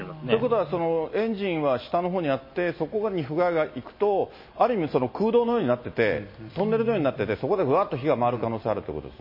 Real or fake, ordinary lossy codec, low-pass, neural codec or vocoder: real; MP3, 24 kbps; 5.4 kHz; none